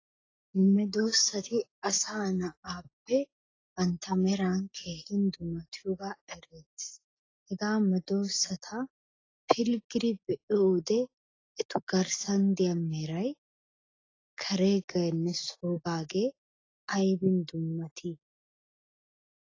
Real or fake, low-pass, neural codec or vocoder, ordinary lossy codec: real; 7.2 kHz; none; AAC, 32 kbps